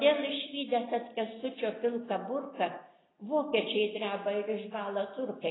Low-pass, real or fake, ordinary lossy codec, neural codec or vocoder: 7.2 kHz; real; AAC, 16 kbps; none